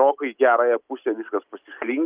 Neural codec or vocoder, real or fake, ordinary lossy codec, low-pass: none; real; Opus, 24 kbps; 3.6 kHz